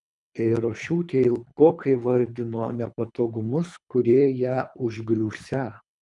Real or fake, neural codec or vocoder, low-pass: fake; codec, 24 kHz, 3 kbps, HILCodec; 10.8 kHz